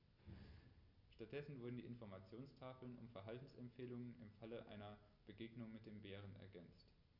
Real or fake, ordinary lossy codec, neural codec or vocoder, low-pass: real; none; none; 5.4 kHz